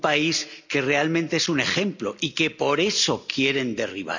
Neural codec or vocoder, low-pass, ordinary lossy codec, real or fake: none; 7.2 kHz; none; real